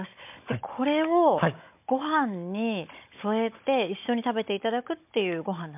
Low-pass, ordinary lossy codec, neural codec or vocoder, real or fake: 3.6 kHz; MP3, 32 kbps; codec, 16 kHz, 16 kbps, FunCodec, trained on Chinese and English, 50 frames a second; fake